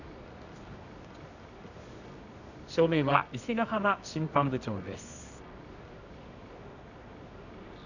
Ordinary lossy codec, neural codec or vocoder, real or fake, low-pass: none; codec, 24 kHz, 0.9 kbps, WavTokenizer, medium music audio release; fake; 7.2 kHz